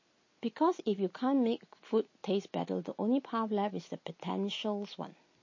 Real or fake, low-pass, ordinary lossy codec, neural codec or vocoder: real; 7.2 kHz; MP3, 32 kbps; none